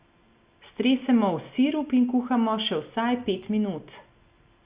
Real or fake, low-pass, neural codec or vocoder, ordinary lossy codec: real; 3.6 kHz; none; Opus, 64 kbps